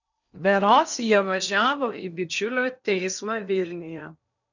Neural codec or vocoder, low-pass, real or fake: codec, 16 kHz in and 24 kHz out, 0.8 kbps, FocalCodec, streaming, 65536 codes; 7.2 kHz; fake